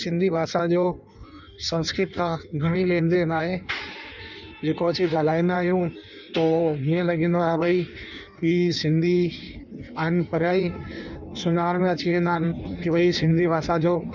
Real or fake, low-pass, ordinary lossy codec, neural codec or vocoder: fake; 7.2 kHz; none; codec, 16 kHz in and 24 kHz out, 1.1 kbps, FireRedTTS-2 codec